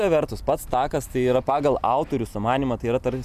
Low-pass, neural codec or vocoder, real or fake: 14.4 kHz; none; real